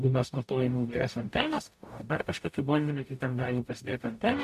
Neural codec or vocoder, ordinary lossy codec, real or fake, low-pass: codec, 44.1 kHz, 0.9 kbps, DAC; MP3, 64 kbps; fake; 14.4 kHz